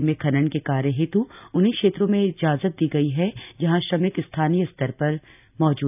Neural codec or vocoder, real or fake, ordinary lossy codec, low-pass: none; real; none; 3.6 kHz